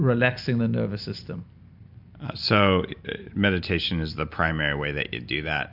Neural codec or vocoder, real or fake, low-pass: none; real; 5.4 kHz